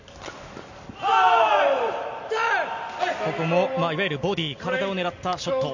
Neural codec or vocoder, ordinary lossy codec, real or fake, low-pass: none; none; real; 7.2 kHz